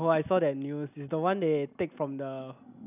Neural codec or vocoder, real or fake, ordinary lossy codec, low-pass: none; real; none; 3.6 kHz